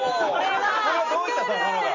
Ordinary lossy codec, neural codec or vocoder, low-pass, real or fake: none; none; 7.2 kHz; real